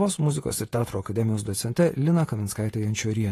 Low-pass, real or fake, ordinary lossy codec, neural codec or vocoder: 14.4 kHz; fake; AAC, 48 kbps; vocoder, 48 kHz, 128 mel bands, Vocos